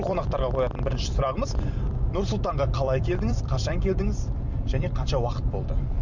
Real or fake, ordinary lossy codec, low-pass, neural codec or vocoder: fake; none; 7.2 kHz; vocoder, 44.1 kHz, 128 mel bands every 256 samples, BigVGAN v2